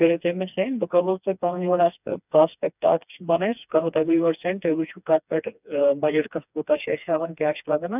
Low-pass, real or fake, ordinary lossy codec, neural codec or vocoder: 3.6 kHz; fake; none; codec, 16 kHz, 2 kbps, FreqCodec, smaller model